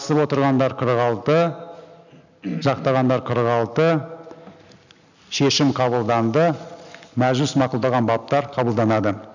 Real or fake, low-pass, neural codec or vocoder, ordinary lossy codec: real; 7.2 kHz; none; none